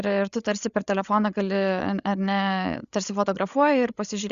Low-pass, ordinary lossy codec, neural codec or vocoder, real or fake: 7.2 kHz; Opus, 64 kbps; codec, 16 kHz, 8 kbps, FreqCodec, larger model; fake